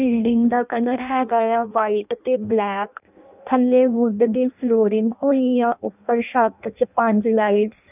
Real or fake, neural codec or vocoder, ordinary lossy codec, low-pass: fake; codec, 16 kHz in and 24 kHz out, 0.6 kbps, FireRedTTS-2 codec; none; 3.6 kHz